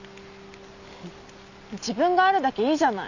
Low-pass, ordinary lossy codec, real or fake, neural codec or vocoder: 7.2 kHz; none; real; none